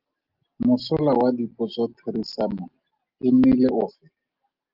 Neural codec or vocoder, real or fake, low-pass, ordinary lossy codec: none; real; 5.4 kHz; Opus, 32 kbps